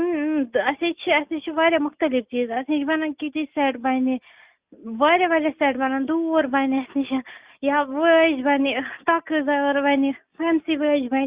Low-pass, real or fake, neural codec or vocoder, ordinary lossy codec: 3.6 kHz; real; none; Opus, 64 kbps